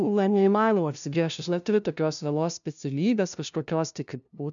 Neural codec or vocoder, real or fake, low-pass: codec, 16 kHz, 0.5 kbps, FunCodec, trained on LibriTTS, 25 frames a second; fake; 7.2 kHz